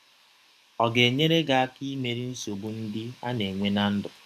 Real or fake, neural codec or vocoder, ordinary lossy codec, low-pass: fake; codec, 44.1 kHz, 7.8 kbps, DAC; MP3, 96 kbps; 14.4 kHz